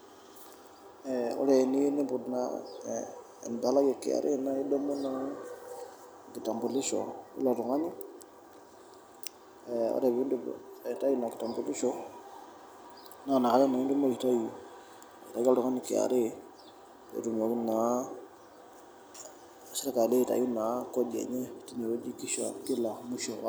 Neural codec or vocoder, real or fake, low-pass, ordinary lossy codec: none; real; none; none